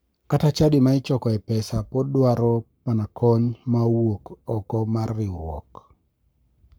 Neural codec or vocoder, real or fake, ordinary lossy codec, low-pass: codec, 44.1 kHz, 7.8 kbps, Pupu-Codec; fake; none; none